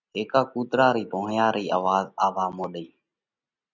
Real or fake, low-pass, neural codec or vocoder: real; 7.2 kHz; none